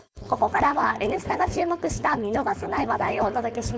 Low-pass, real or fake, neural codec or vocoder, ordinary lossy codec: none; fake; codec, 16 kHz, 4.8 kbps, FACodec; none